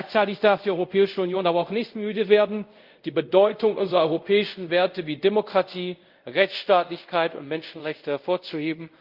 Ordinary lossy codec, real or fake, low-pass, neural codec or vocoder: Opus, 24 kbps; fake; 5.4 kHz; codec, 24 kHz, 0.5 kbps, DualCodec